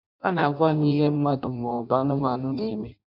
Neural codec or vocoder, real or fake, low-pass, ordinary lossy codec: codec, 16 kHz in and 24 kHz out, 0.6 kbps, FireRedTTS-2 codec; fake; 5.4 kHz; none